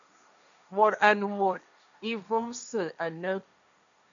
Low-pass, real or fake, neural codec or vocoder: 7.2 kHz; fake; codec, 16 kHz, 1.1 kbps, Voila-Tokenizer